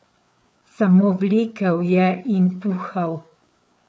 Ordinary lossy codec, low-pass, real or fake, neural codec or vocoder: none; none; fake; codec, 16 kHz, 4 kbps, FreqCodec, larger model